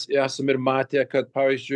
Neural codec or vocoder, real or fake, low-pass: none; real; 10.8 kHz